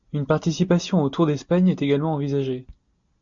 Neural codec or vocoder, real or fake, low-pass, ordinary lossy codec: none; real; 7.2 kHz; MP3, 48 kbps